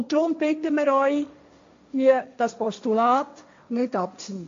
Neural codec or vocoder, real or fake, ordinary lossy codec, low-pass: codec, 16 kHz, 1.1 kbps, Voila-Tokenizer; fake; MP3, 48 kbps; 7.2 kHz